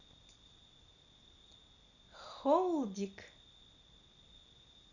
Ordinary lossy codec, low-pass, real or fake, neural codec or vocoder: none; 7.2 kHz; real; none